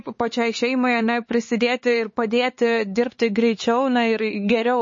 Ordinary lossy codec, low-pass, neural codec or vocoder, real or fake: MP3, 32 kbps; 7.2 kHz; codec, 16 kHz, 4 kbps, X-Codec, HuBERT features, trained on LibriSpeech; fake